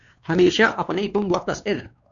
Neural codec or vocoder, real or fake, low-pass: codec, 16 kHz, 2 kbps, X-Codec, WavLM features, trained on Multilingual LibriSpeech; fake; 7.2 kHz